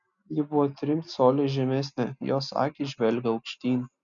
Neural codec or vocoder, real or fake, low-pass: none; real; 7.2 kHz